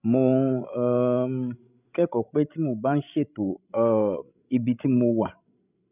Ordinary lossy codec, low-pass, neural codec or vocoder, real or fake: none; 3.6 kHz; codec, 16 kHz, 16 kbps, FreqCodec, larger model; fake